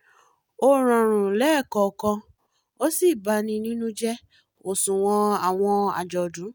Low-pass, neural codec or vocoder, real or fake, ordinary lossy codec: none; none; real; none